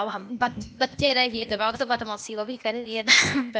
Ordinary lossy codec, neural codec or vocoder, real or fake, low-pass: none; codec, 16 kHz, 0.8 kbps, ZipCodec; fake; none